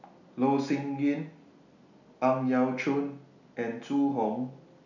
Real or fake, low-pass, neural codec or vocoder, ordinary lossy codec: real; 7.2 kHz; none; none